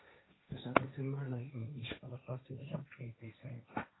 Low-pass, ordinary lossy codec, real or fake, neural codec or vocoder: 7.2 kHz; AAC, 16 kbps; fake; codec, 16 kHz, 1.1 kbps, Voila-Tokenizer